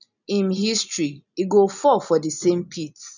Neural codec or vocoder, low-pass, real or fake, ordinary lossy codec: none; 7.2 kHz; real; none